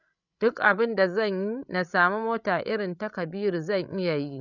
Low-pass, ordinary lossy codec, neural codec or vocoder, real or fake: 7.2 kHz; none; codec, 16 kHz, 16 kbps, FreqCodec, larger model; fake